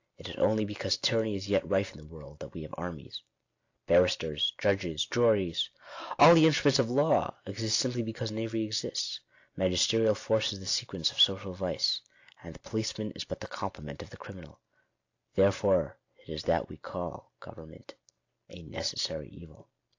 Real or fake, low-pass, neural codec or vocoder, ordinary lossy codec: real; 7.2 kHz; none; AAC, 48 kbps